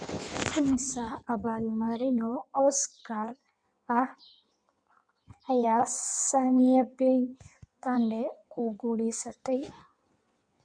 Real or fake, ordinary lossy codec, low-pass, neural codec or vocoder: fake; Opus, 64 kbps; 9.9 kHz; codec, 16 kHz in and 24 kHz out, 1.1 kbps, FireRedTTS-2 codec